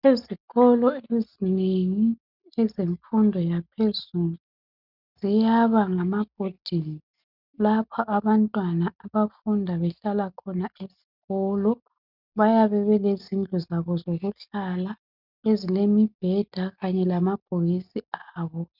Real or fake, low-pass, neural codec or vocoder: real; 5.4 kHz; none